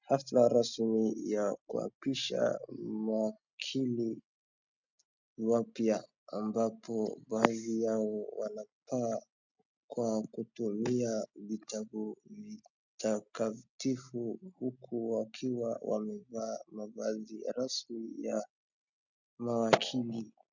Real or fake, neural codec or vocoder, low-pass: real; none; 7.2 kHz